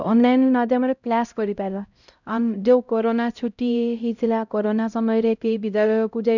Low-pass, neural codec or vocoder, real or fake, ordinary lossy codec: 7.2 kHz; codec, 16 kHz, 0.5 kbps, X-Codec, HuBERT features, trained on LibriSpeech; fake; none